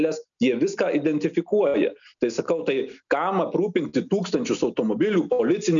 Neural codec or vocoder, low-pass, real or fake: none; 7.2 kHz; real